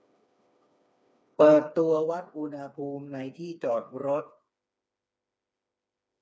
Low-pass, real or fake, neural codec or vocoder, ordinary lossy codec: none; fake; codec, 16 kHz, 4 kbps, FreqCodec, smaller model; none